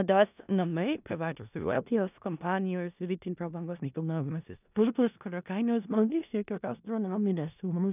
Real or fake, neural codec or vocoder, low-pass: fake; codec, 16 kHz in and 24 kHz out, 0.4 kbps, LongCat-Audio-Codec, four codebook decoder; 3.6 kHz